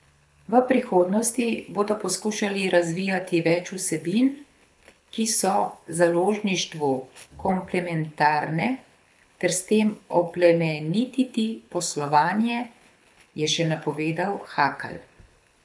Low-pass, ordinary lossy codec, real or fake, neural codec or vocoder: none; none; fake; codec, 24 kHz, 6 kbps, HILCodec